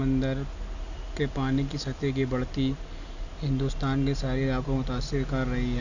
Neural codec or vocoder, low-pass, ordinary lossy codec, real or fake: none; 7.2 kHz; none; real